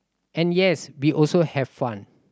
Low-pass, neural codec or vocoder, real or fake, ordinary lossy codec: none; none; real; none